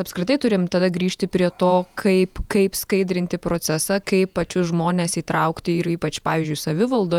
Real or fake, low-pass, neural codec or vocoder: fake; 19.8 kHz; vocoder, 48 kHz, 128 mel bands, Vocos